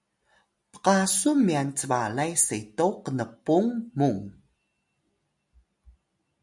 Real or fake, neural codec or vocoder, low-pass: real; none; 10.8 kHz